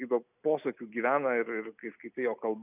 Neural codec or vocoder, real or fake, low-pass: none; real; 3.6 kHz